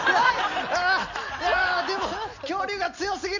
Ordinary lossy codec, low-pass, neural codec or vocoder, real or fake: none; 7.2 kHz; none; real